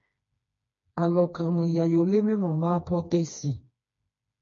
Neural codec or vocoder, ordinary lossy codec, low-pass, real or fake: codec, 16 kHz, 2 kbps, FreqCodec, smaller model; MP3, 48 kbps; 7.2 kHz; fake